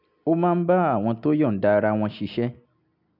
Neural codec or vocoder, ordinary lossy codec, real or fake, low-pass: none; none; real; 5.4 kHz